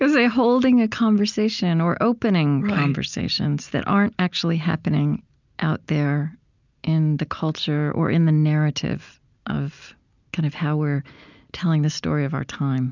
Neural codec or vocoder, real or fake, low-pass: none; real; 7.2 kHz